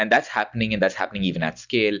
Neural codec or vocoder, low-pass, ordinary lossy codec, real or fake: none; 7.2 kHz; Opus, 64 kbps; real